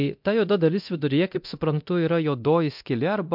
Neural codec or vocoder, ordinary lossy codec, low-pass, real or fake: codec, 24 kHz, 0.9 kbps, DualCodec; MP3, 48 kbps; 5.4 kHz; fake